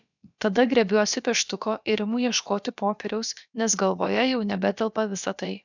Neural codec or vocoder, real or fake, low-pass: codec, 16 kHz, about 1 kbps, DyCAST, with the encoder's durations; fake; 7.2 kHz